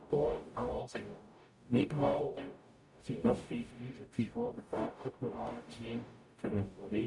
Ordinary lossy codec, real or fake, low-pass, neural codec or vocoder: none; fake; 10.8 kHz; codec, 44.1 kHz, 0.9 kbps, DAC